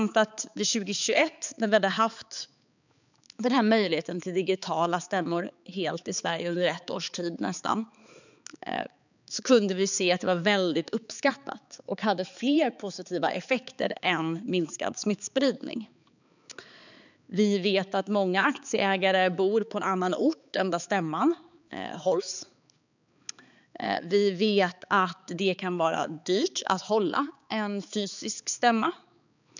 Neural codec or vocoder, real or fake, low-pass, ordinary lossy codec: codec, 16 kHz, 4 kbps, X-Codec, HuBERT features, trained on balanced general audio; fake; 7.2 kHz; none